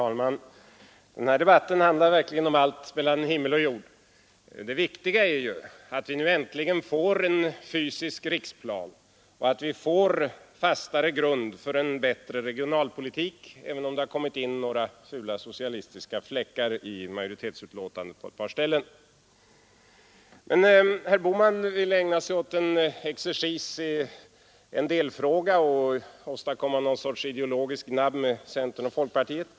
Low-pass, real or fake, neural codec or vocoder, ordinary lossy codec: none; real; none; none